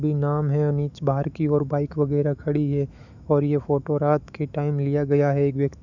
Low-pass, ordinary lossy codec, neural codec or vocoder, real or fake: 7.2 kHz; none; autoencoder, 48 kHz, 128 numbers a frame, DAC-VAE, trained on Japanese speech; fake